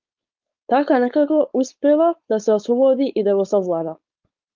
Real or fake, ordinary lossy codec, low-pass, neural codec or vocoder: fake; Opus, 32 kbps; 7.2 kHz; codec, 16 kHz, 4.8 kbps, FACodec